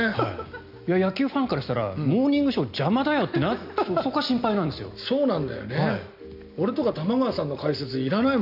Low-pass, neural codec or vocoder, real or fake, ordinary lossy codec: 5.4 kHz; none; real; none